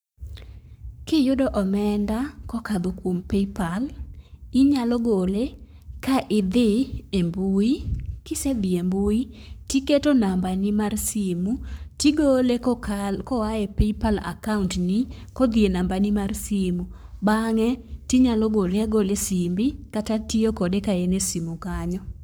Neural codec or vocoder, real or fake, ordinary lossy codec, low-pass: codec, 44.1 kHz, 7.8 kbps, Pupu-Codec; fake; none; none